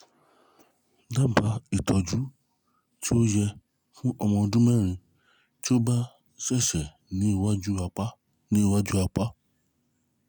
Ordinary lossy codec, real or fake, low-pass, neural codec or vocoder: none; real; none; none